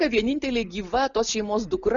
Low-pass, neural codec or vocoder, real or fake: 7.2 kHz; none; real